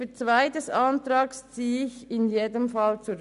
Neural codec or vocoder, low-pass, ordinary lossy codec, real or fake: none; 10.8 kHz; none; real